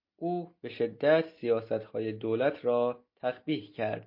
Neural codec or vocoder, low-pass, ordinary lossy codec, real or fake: none; 5.4 kHz; MP3, 32 kbps; real